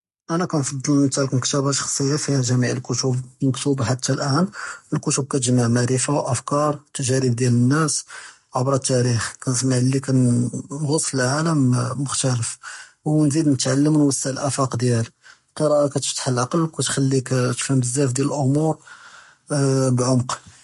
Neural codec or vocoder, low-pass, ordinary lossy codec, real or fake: codec, 44.1 kHz, 7.8 kbps, Pupu-Codec; 14.4 kHz; MP3, 48 kbps; fake